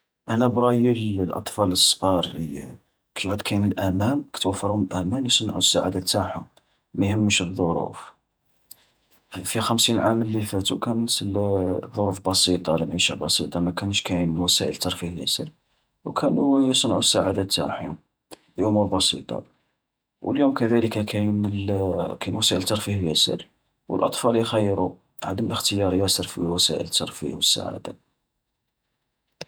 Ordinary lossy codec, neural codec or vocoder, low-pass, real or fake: none; vocoder, 48 kHz, 128 mel bands, Vocos; none; fake